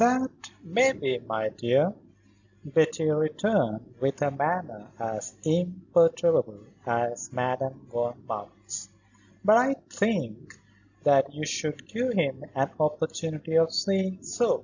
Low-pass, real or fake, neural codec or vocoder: 7.2 kHz; real; none